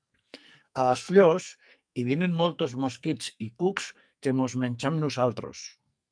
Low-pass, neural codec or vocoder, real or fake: 9.9 kHz; codec, 44.1 kHz, 2.6 kbps, SNAC; fake